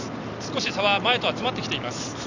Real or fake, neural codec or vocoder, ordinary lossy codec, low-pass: real; none; Opus, 64 kbps; 7.2 kHz